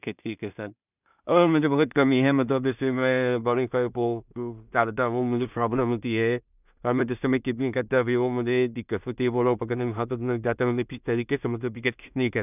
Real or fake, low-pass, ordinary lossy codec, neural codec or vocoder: fake; 3.6 kHz; none; codec, 16 kHz in and 24 kHz out, 0.4 kbps, LongCat-Audio-Codec, two codebook decoder